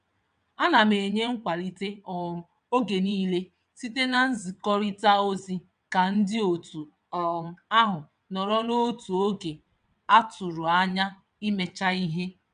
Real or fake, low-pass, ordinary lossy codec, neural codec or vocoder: fake; 9.9 kHz; none; vocoder, 22.05 kHz, 80 mel bands, WaveNeXt